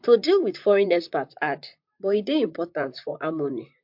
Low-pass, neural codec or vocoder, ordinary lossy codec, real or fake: 5.4 kHz; vocoder, 44.1 kHz, 128 mel bands, Pupu-Vocoder; MP3, 48 kbps; fake